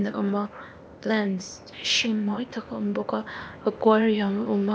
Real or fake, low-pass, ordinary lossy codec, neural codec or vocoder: fake; none; none; codec, 16 kHz, 0.8 kbps, ZipCodec